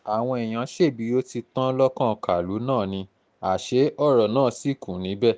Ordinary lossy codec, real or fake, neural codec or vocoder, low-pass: none; real; none; none